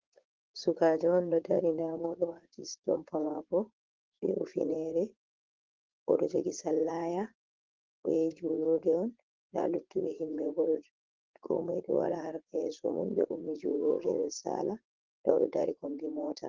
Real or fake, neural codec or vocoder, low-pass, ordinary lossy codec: fake; vocoder, 44.1 kHz, 80 mel bands, Vocos; 7.2 kHz; Opus, 16 kbps